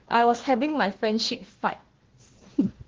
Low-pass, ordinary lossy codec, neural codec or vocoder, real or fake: 7.2 kHz; Opus, 16 kbps; codec, 16 kHz, 1 kbps, FunCodec, trained on Chinese and English, 50 frames a second; fake